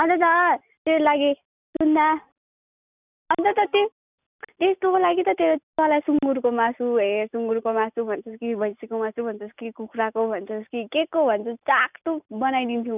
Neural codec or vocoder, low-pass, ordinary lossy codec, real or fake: none; 3.6 kHz; none; real